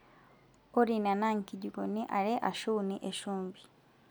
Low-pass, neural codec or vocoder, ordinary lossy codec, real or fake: none; none; none; real